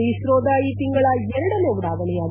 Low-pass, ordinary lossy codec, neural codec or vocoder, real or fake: 3.6 kHz; none; none; real